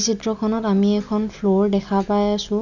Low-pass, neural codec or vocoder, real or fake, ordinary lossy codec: 7.2 kHz; none; real; none